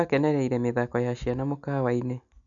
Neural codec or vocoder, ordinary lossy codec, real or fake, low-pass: none; none; real; 7.2 kHz